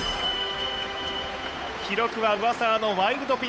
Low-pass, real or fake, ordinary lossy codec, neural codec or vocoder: none; fake; none; codec, 16 kHz, 8 kbps, FunCodec, trained on Chinese and English, 25 frames a second